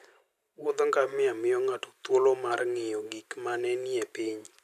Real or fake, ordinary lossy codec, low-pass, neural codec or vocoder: real; none; 14.4 kHz; none